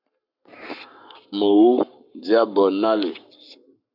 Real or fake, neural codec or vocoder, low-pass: fake; codec, 44.1 kHz, 7.8 kbps, Pupu-Codec; 5.4 kHz